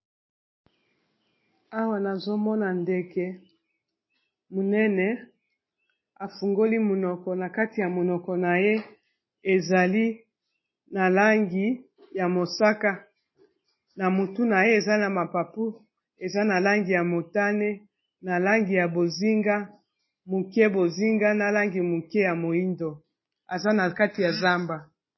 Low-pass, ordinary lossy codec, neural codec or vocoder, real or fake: 7.2 kHz; MP3, 24 kbps; none; real